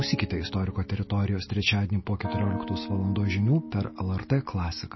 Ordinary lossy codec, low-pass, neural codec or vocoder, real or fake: MP3, 24 kbps; 7.2 kHz; none; real